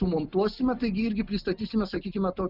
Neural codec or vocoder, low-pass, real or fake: none; 5.4 kHz; real